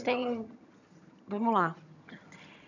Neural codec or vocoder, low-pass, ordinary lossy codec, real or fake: vocoder, 22.05 kHz, 80 mel bands, HiFi-GAN; 7.2 kHz; none; fake